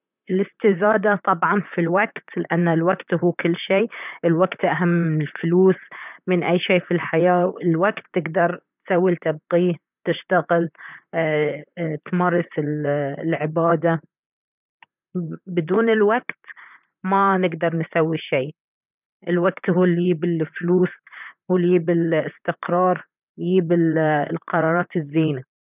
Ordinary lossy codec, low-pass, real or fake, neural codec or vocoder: none; 3.6 kHz; fake; vocoder, 44.1 kHz, 128 mel bands, Pupu-Vocoder